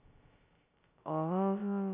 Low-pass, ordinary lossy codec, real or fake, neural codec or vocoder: 3.6 kHz; none; fake; codec, 16 kHz, 0.2 kbps, FocalCodec